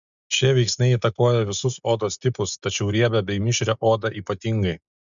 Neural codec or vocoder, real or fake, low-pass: none; real; 7.2 kHz